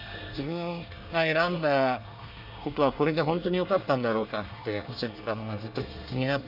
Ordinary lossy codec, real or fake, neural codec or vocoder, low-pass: none; fake; codec, 24 kHz, 1 kbps, SNAC; 5.4 kHz